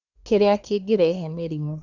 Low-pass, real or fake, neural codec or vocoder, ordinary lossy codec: 7.2 kHz; fake; codec, 24 kHz, 1 kbps, SNAC; none